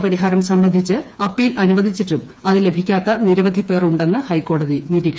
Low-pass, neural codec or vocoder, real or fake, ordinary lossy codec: none; codec, 16 kHz, 4 kbps, FreqCodec, smaller model; fake; none